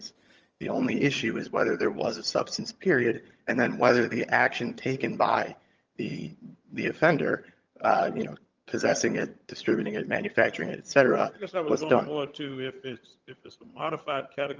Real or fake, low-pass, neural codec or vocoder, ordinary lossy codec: fake; 7.2 kHz; vocoder, 22.05 kHz, 80 mel bands, HiFi-GAN; Opus, 24 kbps